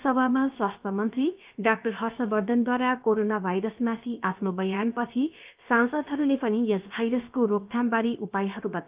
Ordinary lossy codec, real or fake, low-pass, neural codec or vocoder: Opus, 24 kbps; fake; 3.6 kHz; codec, 16 kHz, about 1 kbps, DyCAST, with the encoder's durations